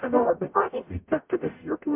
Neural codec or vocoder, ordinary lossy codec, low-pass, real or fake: codec, 44.1 kHz, 0.9 kbps, DAC; MP3, 32 kbps; 3.6 kHz; fake